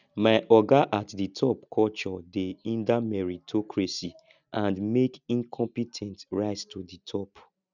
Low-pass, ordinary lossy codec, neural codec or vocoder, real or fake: 7.2 kHz; none; none; real